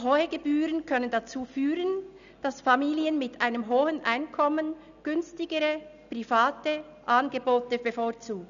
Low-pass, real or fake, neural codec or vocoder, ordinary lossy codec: 7.2 kHz; real; none; none